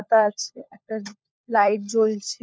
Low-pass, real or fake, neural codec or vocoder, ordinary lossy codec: none; fake; codec, 16 kHz, 16 kbps, FunCodec, trained on LibriTTS, 50 frames a second; none